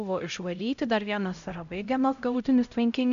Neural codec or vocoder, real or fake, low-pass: codec, 16 kHz, 0.5 kbps, X-Codec, HuBERT features, trained on LibriSpeech; fake; 7.2 kHz